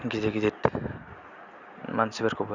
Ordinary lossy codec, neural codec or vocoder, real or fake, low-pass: Opus, 64 kbps; none; real; 7.2 kHz